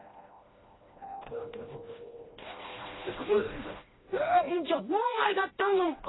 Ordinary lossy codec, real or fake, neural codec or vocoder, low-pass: AAC, 16 kbps; fake; codec, 16 kHz, 1 kbps, FreqCodec, smaller model; 7.2 kHz